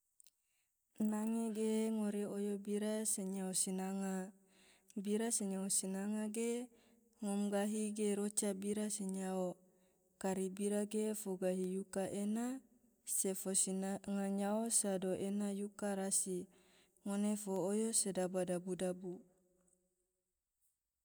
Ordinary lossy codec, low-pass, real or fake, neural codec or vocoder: none; none; real; none